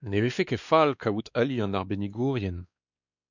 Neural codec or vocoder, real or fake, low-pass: codec, 16 kHz, 2 kbps, X-Codec, WavLM features, trained on Multilingual LibriSpeech; fake; 7.2 kHz